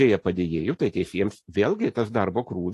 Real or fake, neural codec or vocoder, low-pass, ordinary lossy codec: real; none; 14.4 kHz; AAC, 48 kbps